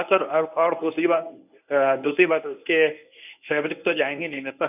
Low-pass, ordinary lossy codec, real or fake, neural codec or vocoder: 3.6 kHz; none; fake; codec, 24 kHz, 0.9 kbps, WavTokenizer, medium speech release version 1